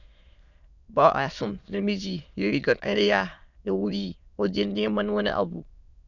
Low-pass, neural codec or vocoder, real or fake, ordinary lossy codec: 7.2 kHz; autoencoder, 22.05 kHz, a latent of 192 numbers a frame, VITS, trained on many speakers; fake; none